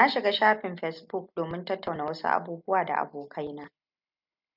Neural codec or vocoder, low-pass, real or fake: none; 5.4 kHz; real